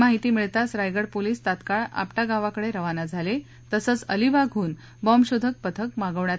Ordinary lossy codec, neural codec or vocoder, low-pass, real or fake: none; none; none; real